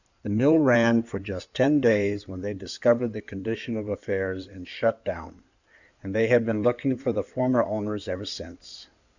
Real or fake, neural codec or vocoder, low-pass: fake; codec, 16 kHz in and 24 kHz out, 2.2 kbps, FireRedTTS-2 codec; 7.2 kHz